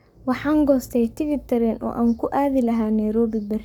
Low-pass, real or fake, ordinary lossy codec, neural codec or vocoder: 19.8 kHz; fake; none; codec, 44.1 kHz, 7.8 kbps, Pupu-Codec